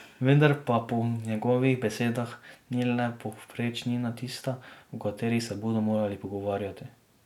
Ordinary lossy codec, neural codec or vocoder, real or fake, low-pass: none; none; real; 19.8 kHz